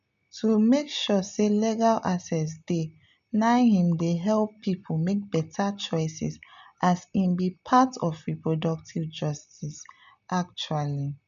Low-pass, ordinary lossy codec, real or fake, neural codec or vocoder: 7.2 kHz; MP3, 96 kbps; real; none